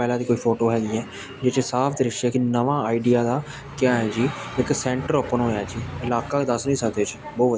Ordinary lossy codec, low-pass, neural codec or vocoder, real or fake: none; none; none; real